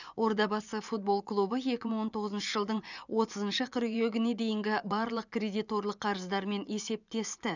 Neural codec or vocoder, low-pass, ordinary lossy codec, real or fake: vocoder, 24 kHz, 100 mel bands, Vocos; 7.2 kHz; none; fake